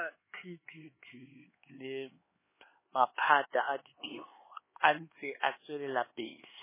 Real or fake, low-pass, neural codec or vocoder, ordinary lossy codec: fake; 3.6 kHz; codec, 16 kHz, 4 kbps, X-Codec, HuBERT features, trained on LibriSpeech; MP3, 16 kbps